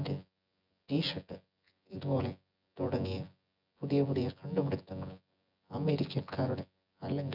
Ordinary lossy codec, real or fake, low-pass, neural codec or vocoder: none; fake; 5.4 kHz; vocoder, 24 kHz, 100 mel bands, Vocos